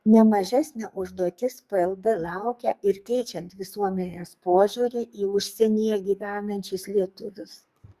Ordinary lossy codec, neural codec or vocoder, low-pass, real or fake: Opus, 64 kbps; codec, 44.1 kHz, 2.6 kbps, SNAC; 14.4 kHz; fake